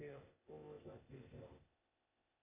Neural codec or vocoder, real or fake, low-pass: codec, 16 kHz, 0.8 kbps, ZipCodec; fake; 3.6 kHz